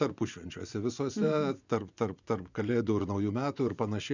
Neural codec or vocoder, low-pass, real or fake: vocoder, 24 kHz, 100 mel bands, Vocos; 7.2 kHz; fake